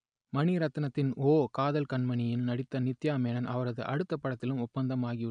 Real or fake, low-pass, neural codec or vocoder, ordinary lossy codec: real; 9.9 kHz; none; none